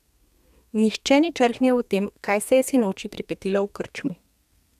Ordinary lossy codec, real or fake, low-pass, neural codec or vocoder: none; fake; 14.4 kHz; codec, 32 kHz, 1.9 kbps, SNAC